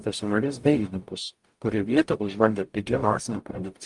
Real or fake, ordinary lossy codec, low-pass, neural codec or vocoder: fake; Opus, 32 kbps; 10.8 kHz; codec, 44.1 kHz, 0.9 kbps, DAC